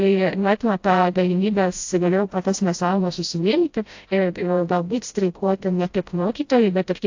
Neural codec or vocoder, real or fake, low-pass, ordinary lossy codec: codec, 16 kHz, 0.5 kbps, FreqCodec, smaller model; fake; 7.2 kHz; AAC, 48 kbps